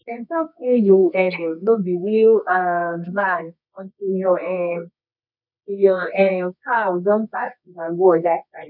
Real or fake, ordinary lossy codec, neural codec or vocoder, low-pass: fake; none; codec, 24 kHz, 0.9 kbps, WavTokenizer, medium music audio release; 5.4 kHz